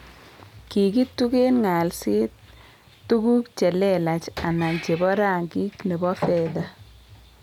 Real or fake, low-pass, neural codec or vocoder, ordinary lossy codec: real; 19.8 kHz; none; none